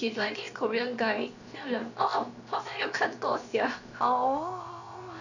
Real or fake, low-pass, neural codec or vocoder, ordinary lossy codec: fake; 7.2 kHz; codec, 16 kHz, 0.7 kbps, FocalCodec; none